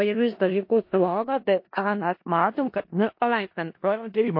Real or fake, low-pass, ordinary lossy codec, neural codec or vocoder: fake; 5.4 kHz; MP3, 32 kbps; codec, 16 kHz in and 24 kHz out, 0.4 kbps, LongCat-Audio-Codec, four codebook decoder